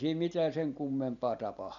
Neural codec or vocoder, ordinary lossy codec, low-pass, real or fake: none; none; 7.2 kHz; real